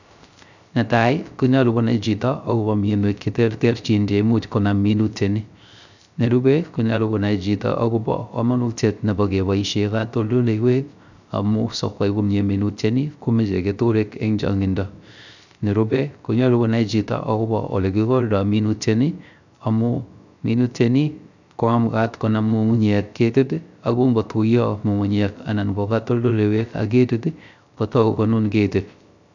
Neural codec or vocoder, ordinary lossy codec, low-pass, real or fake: codec, 16 kHz, 0.3 kbps, FocalCodec; none; 7.2 kHz; fake